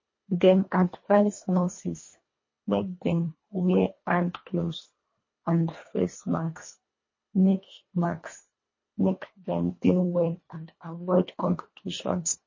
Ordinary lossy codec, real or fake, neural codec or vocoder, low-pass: MP3, 32 kbps; fake; codec, 24 kHz, 1.5 kbps, HILCodec; 7.2 kHz